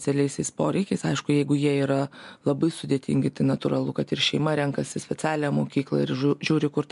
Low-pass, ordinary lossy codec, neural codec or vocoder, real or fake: 10.8 kHz; MP3, 64 kbps; none; real